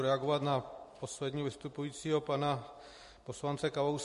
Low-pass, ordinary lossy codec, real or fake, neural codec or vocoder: 14.4 kHz; MP3, 48 kbps; real; none